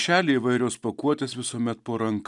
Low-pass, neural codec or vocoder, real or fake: 10.8 kHz; none; real